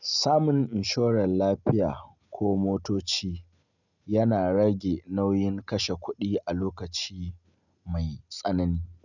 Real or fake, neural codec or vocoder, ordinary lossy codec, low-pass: real; none; none; 7.2 kHz